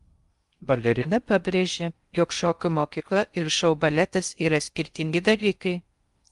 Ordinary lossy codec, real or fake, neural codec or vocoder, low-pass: Opus, 32 kbps; fake; codec, 16 kHz in and 24 kHz out, 0.6 kbps, FocalCodec, streaming, 2048 codes; 10.8 kHz